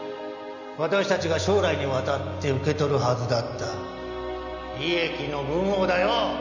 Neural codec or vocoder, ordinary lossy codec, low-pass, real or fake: none; none; 7.2 kHz; real